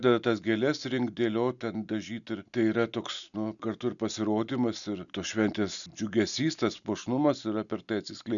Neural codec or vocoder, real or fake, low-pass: none; real; 7.2 kHz